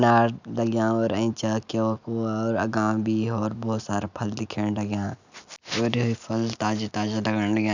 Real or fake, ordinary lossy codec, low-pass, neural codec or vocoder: real; none; 7.2 kHz; none